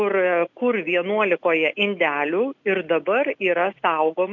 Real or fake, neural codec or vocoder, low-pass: real; none; 7.2 kHz